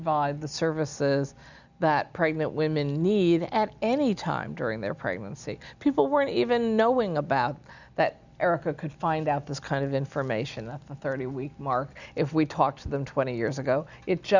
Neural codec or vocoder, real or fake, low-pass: none; real; 7.2 kHz